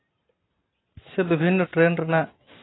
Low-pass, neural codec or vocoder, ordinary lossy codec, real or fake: 7.2 kHz; none; AAC, 16 kbps; real